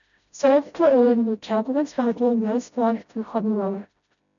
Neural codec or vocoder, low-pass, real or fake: codec, 16 kHz, 0.5 kbps, FreqCodec, smaller model; 7.2 kHz; fake